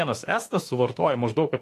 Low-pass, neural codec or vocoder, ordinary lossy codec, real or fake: 14.4 kHz; autoencoder, 48 kHz, 32 numbers a frame, DAC-VAE, trained on Japanese speech; AAC, 48 kbps; fake